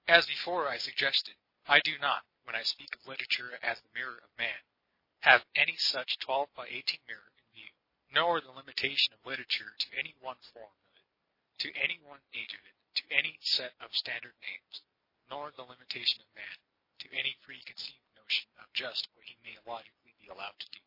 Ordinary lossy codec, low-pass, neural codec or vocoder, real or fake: MP3, 24 kbps; 5.4 kHz; none; real